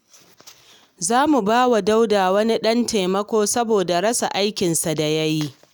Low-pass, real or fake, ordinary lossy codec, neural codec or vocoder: none; real; none; none